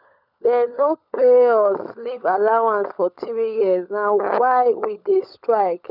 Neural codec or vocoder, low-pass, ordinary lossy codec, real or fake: codec, 16 kHz, 16 kbps, FunCodec, trained on LibriTTS, 50 frames a second; 5.4 kHz; none; fake